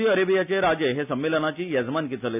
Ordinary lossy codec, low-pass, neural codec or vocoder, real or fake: none; 3.6 kHz; none; real